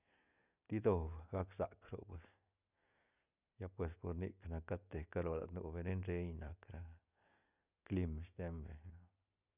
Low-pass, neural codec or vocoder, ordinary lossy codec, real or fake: 3.6 kHz; none; none; real